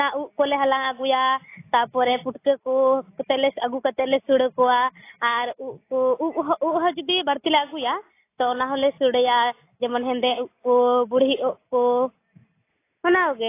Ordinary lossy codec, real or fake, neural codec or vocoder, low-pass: AAC, 24 kbps; real; none; 3.6 kHz